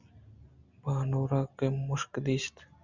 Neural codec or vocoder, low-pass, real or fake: none; 7.2 kHz; real